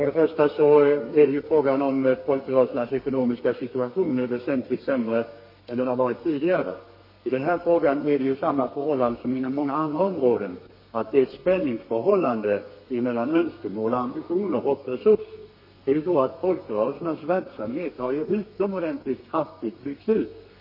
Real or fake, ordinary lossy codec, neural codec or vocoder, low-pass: fake; MP3, 24 kbps; codec, 32 kHz, 1.9 kbps, SNAC; 5.4 kHz